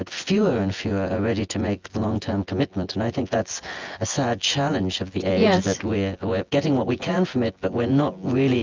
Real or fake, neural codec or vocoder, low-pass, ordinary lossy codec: fake; vocoder, 24 kHz, 100 mel bands, Vocos; 7.2 kHz; Opus, 32 kbps